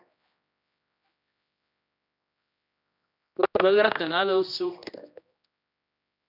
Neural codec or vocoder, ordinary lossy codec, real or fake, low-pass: codec, 16 kHz, 1 kbps, X-Codec, HuBERT features, trained on general audio; AAC, 48 kbps; fake; 5.4 kHz